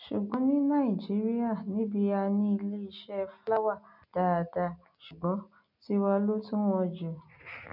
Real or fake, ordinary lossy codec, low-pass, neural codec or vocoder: real; none; 5.4 kHz; none